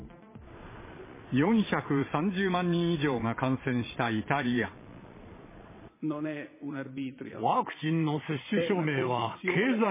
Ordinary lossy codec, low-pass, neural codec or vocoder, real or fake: MP3, 16 kbps; 3.6 kHz; vocoder, 22.05 kHz, 80 mel bands, Vocos; fake